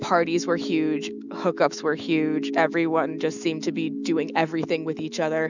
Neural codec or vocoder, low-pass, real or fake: autoencoder, 48 kHz, 128 numbers a frame, DAC-VAE, trained on Japanese speech; 7.2 kHz; fake